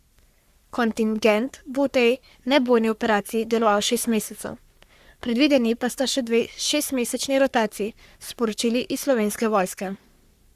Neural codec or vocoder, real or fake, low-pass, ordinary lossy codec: codec, 44.1 kHz, 3.4 kbps, Pupu-Codec; fake; 14.4 kHz; Opus, 64 kbps